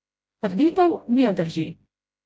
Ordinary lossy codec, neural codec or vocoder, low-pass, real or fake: none; codec, 16 kHz, 0.5 kbps, FreqCodec, smaller model; none; fake